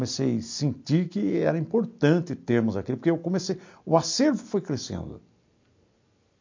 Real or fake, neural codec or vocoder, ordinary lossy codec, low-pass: real; none; MP3, 48 kbps; 7.2 kHz